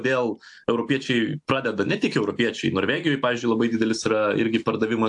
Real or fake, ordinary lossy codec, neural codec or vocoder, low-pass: real; AAC, 64 kbps; none; 10.8 kHz